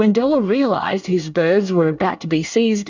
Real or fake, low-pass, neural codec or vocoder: fake; 7.2 kHz; codec, 24 kHz, 1 kbps, SNAC